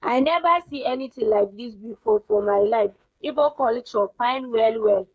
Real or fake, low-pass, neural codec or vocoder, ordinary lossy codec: fake; none; codec, 16 kHz, 8 kbps, FreqCodec, smaller model; none